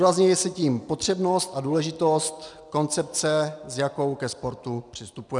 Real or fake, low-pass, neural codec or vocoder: real; 10.8 kHz; none